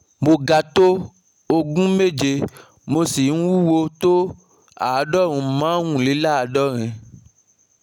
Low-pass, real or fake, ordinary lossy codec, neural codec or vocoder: 19.8 kHz; real; none; none